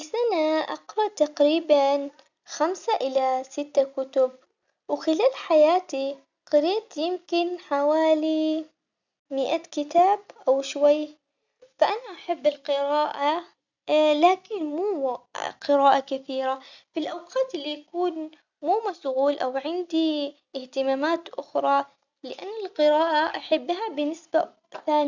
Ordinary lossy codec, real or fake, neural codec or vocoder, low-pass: none; real; none; 7.2 kHz